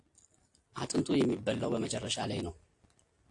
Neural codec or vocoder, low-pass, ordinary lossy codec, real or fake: none; 10.8 kHz; AAC, 48 kbps; real